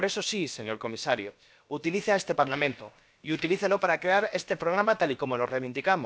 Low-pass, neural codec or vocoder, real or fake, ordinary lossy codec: none; codec, 16 kHz, about 1 kbps, DyCAST, with the encoder's durations; fake; none